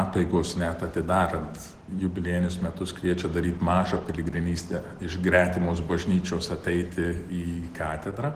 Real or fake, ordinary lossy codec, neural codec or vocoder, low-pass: real; Opus, 16 kbps; none; 14.4 kHz